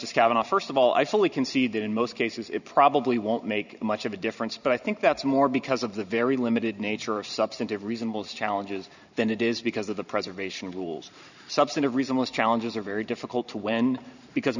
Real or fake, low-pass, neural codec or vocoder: fake; 7.2 kHz; vocoder, 44.1 kHz, 128 mel bands every 512 samples, BigVGAN v2